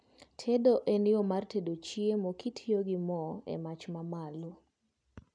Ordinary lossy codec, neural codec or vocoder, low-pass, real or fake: none; none; 9.9 kHz; real